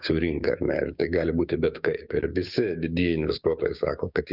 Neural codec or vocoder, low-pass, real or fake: codec, 16 kHz, 6 kbps, DAC; 5.4 kHz; fake